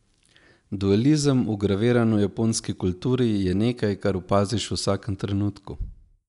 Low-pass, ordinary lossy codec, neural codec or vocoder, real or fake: 10.8 kHz; none; none; real